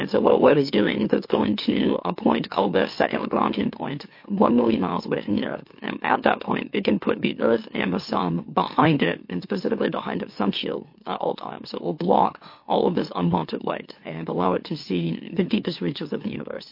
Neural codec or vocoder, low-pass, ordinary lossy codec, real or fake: autoencoder, 44.1 kHz, a latent of 192 numbers a frame, MeloTTS; 5.4 kHz; MP3, 32 kbps; fake